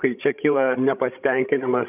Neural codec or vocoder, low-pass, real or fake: codec, 16 kHz, 16 kbps, FreqCodec, larger model; 3.6 kHz; fake